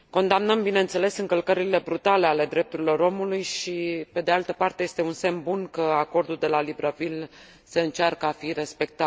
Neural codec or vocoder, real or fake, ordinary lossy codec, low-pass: none; real; none; none